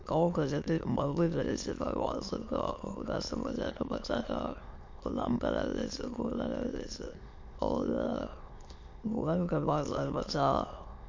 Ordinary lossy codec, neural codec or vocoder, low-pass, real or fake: MP3, 48 kbps; autoencoder, 22.05 kHz, a latent of 192 numbers a frame, VITS, trained on many speakers; 7.2 kHz; fake